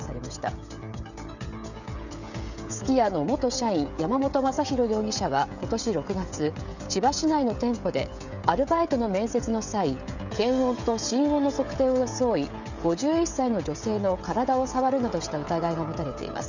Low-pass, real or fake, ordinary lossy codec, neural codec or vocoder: 7.2 kHz; fake; none; codec, 16 kHz, 16 kbps, FreqCodec, smaller model